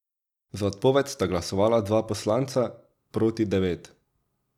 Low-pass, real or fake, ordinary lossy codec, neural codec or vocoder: 19.8 kHz; real; none; none